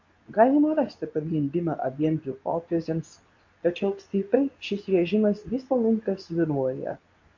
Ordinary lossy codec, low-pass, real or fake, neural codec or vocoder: MP3, 48 kbps; 7.2 kHz; fake; codec, 24 kHz, 0.9 kbps, WavTokenizer, medium speech release version 2